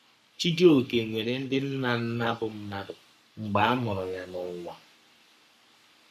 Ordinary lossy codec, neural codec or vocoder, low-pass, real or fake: MP3, 96 kbps; codec, 44.1 kHz, 3.4 kbps, Pupu-Codec; 14.4 kHz; fake